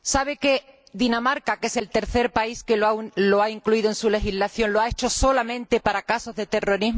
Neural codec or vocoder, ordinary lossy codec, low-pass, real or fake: none; none; none; real